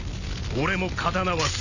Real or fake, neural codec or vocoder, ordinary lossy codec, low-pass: real; none; none; 7.2 kHz